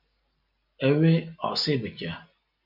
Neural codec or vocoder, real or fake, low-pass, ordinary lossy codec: none; real; 5.4 kHz; MP3, 48 kbps